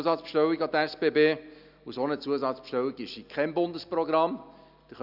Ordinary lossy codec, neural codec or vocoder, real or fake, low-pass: none; none; real; 5.4 kHz